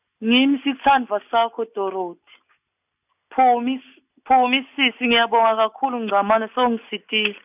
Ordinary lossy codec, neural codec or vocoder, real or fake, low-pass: none; none; real; 3.6 kHz